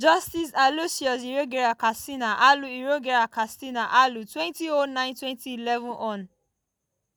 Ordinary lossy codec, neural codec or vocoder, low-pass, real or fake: none; none; none; real